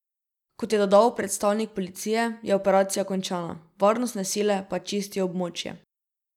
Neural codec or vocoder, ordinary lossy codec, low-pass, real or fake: none; none; 19.8 kHz; real